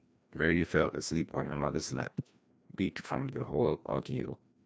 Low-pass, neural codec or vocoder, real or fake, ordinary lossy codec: none; codec, 16 kHz, 1 kbps, FreqCodec, larger model; fake; none